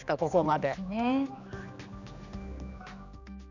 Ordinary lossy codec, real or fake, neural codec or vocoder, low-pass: none; fake; codec, 16 kHz, 2 kbps, X-Codec, HuBERT features, trained on general audio; 7.2 kHz